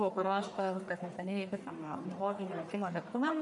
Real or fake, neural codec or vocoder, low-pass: fake; codec, 44.1 kHz, 1.7 kbps, Pupu-Codec; 10.8 kHz